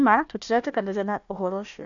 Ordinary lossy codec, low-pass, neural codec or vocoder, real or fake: none; 7.2 kHz; codec, 16 kHz, 1 kbps, FunCodec, trained on Chinese and English, 50 frames a second; fake